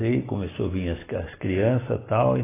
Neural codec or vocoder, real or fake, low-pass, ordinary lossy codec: none; real; 3.6 kHz; AAC, 16 kbps